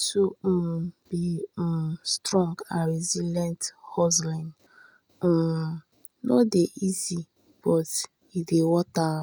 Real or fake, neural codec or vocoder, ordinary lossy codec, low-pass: real; none; none; none